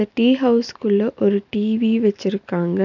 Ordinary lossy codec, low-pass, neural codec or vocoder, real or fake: none; 7.2 kHz; none; real